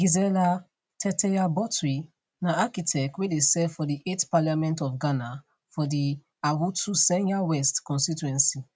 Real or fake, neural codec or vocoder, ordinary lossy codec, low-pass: real; none; none; none